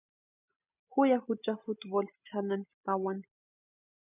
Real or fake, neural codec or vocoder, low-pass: real; none; 3.6 kHz